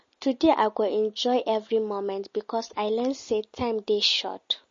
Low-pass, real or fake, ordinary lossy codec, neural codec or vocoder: 7.2 kHz; real; MP3, 32 kbps; none